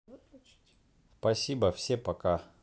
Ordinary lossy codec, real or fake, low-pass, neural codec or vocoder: none; real; none; none